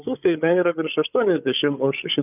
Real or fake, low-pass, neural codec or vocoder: fake; 3.6 kHz; codec, 16 kHz, 8 kbps, FreqCodec, larger model